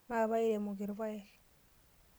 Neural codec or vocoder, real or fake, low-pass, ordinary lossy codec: none; real; none; none